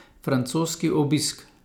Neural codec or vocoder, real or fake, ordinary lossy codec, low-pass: none; real; none; none